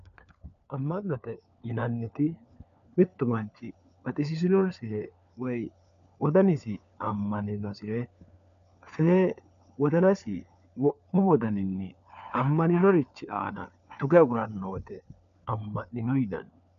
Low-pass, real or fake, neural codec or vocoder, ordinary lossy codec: 7.2 kHz; fake; codec, 16 kHz, 4 kbps, FunCodec, trained on LibriTTS, 50 frames a second; MP3, 96 kbps